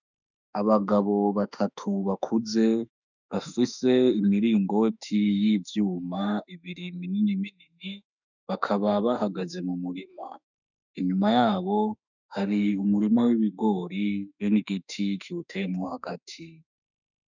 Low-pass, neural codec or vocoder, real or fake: 7.2 kHz; autoencoder, 48 kHz, 32 numbers a frame, DAC-VAE, trained on Japanese speech; fake